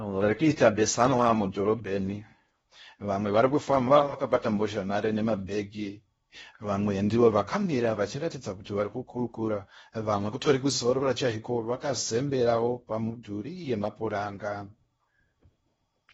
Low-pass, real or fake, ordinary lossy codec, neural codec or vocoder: 10.8 kHz; fake; AAC, 24 kbps; codec, 16 kHz in and 24 kHz out, 0.6 kbps, FocalCodec, streaming, 4096 codes